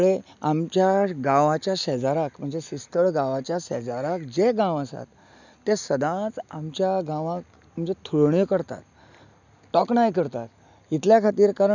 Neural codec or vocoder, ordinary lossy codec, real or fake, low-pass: none; none; real; 7.2 kHz